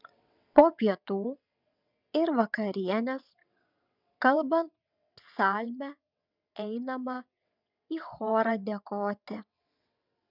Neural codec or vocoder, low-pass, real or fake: vocoder, 22.05 kHz, 80 mel bands, WaveNeXt; 5.4 kHz; fake